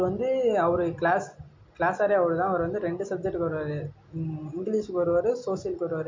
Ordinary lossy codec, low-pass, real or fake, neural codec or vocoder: MP3, 48 kbps; 7.2 kHz; real; none